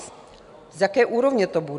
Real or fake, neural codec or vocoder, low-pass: real; none; 10.8 kHz